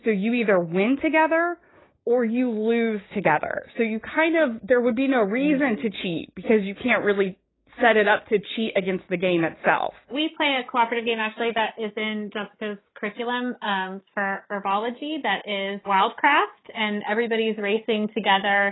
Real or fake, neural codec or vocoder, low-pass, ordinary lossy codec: fake; codec, 16 kHz, 6 kbps, DAC; 7.2 kHz; AAC, 16 kbps